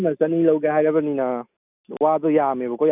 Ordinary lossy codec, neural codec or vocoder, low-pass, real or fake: none; none; 3.6 kHz; real